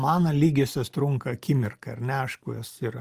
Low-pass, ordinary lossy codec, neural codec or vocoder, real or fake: 14.4 kHz; Opus, 32 kbps; none; real